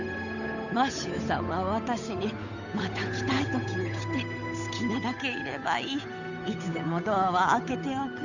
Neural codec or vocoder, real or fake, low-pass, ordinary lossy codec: codec, 16 kHz, 8 kbps, FunCodec, trained on Chinese and English, 25 frames a second; fake; 7.2 kHz; none